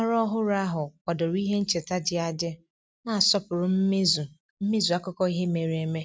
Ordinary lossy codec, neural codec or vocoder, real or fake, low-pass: none; none; real; none